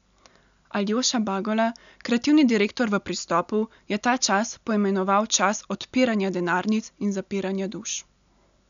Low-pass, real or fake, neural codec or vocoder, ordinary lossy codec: 7.2 kHz; real; none; none